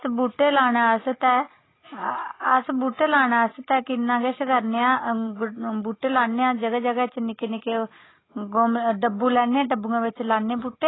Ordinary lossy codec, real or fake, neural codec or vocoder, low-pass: AAC, 16 kbps; real; none; 7.2 kHz